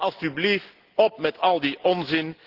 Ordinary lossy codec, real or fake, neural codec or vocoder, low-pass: Opus, 16 kbps; real; none; 5.4 kHz